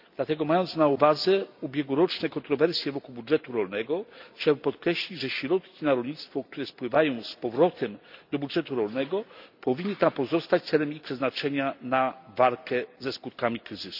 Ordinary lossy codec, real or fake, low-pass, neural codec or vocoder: none; real; 5.4 kHz; none